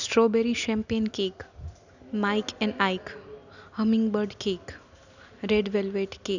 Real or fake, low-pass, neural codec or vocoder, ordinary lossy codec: real; 7.2 kHz; none; none